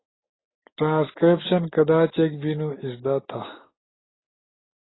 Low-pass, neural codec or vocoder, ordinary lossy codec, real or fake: 7.2 kHz; none; AAC, 16 kbps; real